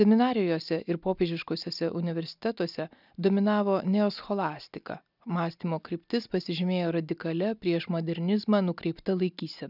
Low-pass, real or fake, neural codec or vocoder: 5.4 kHz; real; none